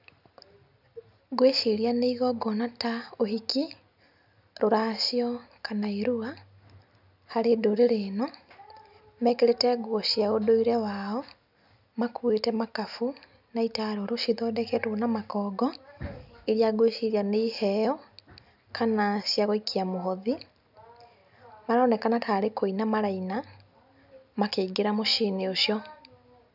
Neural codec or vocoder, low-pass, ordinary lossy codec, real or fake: none; 5.4 kHz; none; real